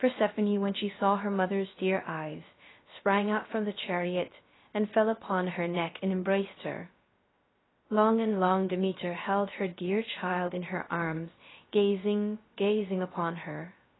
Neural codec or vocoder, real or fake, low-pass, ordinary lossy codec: codec, 16 kHz, 0.3 kbps, FocalCodec; fake; 7.2 kHz; AAC, 16 kbps